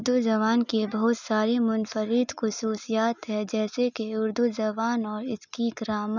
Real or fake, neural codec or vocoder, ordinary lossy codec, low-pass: real; none; none; 7.2 kHz